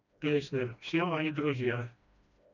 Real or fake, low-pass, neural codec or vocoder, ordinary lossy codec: fake; 7.2 kHz; codec, 16 kHz, 1 kbps, FreqCodec, smaller model; none